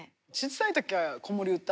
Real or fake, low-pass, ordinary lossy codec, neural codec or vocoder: real; none; none; none